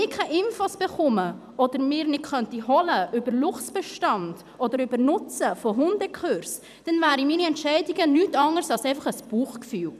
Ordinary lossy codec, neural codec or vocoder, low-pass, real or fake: none; vocoder, 44.1 kHz, 128 mel bands every 256 samples, BigVGAN v2; 14.4 kHz; fake